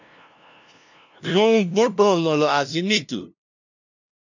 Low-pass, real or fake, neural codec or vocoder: 7.2 kHz; fake; codec, 16 kHz, 0.5 kbps, FunCodec, trained on LibriTTS, 25 frames a second